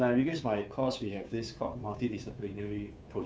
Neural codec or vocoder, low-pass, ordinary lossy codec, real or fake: codec, 16 kHz, 2 kbps, FunCodec, trained on Chinese and English, 25 frames a second; none; none; fake